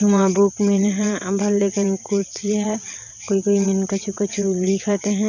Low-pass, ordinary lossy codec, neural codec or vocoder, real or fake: 7.2 kHz; none; vocoder, 22.05 kHz, 80 mel bands, WaveNeXt; fake